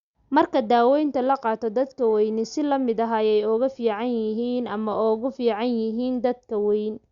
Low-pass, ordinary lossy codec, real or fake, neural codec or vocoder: 7.2 kHz; Opus, 64 kbps; real; none